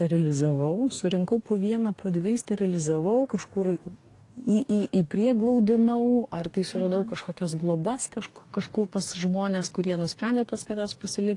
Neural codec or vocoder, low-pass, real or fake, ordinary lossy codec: codec, 44.1 kHz, 2.6 kbps, DAC; 10.8 kHz; fake; AAC, 48 kbps